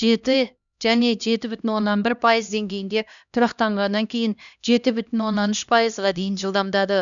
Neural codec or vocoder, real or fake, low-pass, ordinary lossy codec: codec, 16 kHz, 1 kbps, X-Codec, HuBERT features, trained on LibriSpeech; fake; 7.2 kHz; none